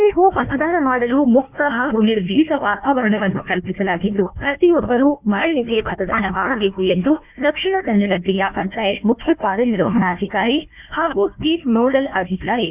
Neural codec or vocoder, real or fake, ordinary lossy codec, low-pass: codec, 16 kHz, 1 kbps, FunCodec, trained on Chinese and English, 50 frames a second; fake; none; 3.6 kHz